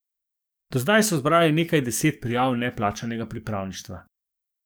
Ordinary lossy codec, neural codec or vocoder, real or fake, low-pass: none; codec, 44.1 kHz, 7.8 kbps, DAC; fake; none